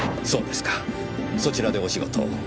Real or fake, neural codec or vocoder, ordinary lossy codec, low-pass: real; none; none; none